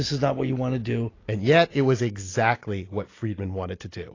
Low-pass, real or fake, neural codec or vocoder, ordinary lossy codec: 7.2 kHz; real; none; AAC, 32 kbps